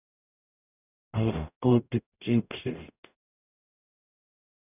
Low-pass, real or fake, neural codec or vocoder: 3.6 kHz; fake; codec, 44.1 kHz, 0.9 kbps, DAC